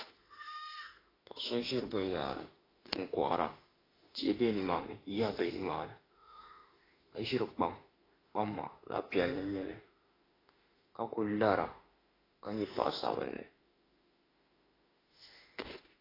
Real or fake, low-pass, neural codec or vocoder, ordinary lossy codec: fake; 5.4 kHz; autoencoder, 48 kHz, 32 numbers a frame, DAC-VAE, trained on Japanese speech; AAC, 24 kbps